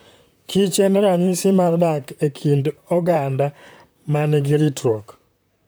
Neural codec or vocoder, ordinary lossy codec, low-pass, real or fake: vocoder, 44.1 kHz, 128 mel bands, Pupu-Vocoder; none; none; fake